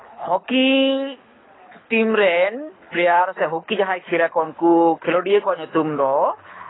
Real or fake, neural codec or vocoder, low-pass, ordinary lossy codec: fake; codec, 24 kHz, 6 kbps, HILCodec; 7.2 kHz; AAC, 16 kbps